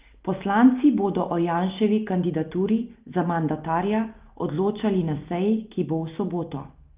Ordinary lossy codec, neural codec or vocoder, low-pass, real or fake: Opus, 24 kbps; none; 3.6 kHz; real